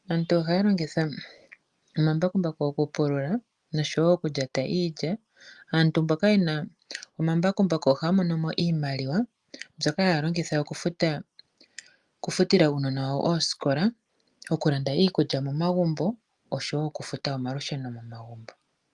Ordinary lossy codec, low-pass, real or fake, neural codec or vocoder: Opus, 32 kbps; 10.8 kHz; real; none